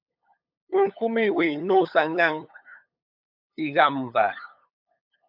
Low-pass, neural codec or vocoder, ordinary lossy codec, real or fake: 5.4 kHz; codec, 16 kHz, 8 kbps, FunCodec, trained on LibriTTS, 25 frames a second; AAC, 48 kbps; fake